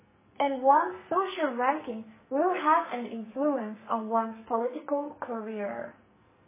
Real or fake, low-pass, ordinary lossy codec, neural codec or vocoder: fake; 3.6 kHz; MP3, 16 kbps; codec, 44.1 kHz, 2.6 kbps, SNAC